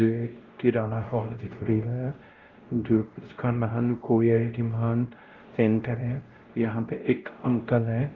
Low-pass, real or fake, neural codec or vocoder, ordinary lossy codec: 7.2 kHz; fake; codec, 16 kHz, 0.5 kbps, X-Codec, WavLM features, trained on Multilingual LibriSpeech; Opus, 16 kbps